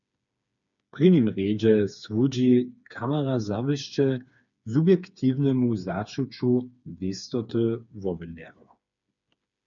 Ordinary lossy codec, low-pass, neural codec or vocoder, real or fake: MP3, 96 kbps; 7.2 kHz; codec, 16 kHz, 4 kbps, FreqCodec, smaller model; fake